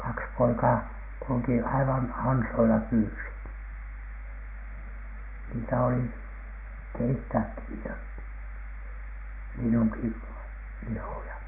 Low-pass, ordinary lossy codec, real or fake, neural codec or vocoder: 3.6 kHz; none; real; none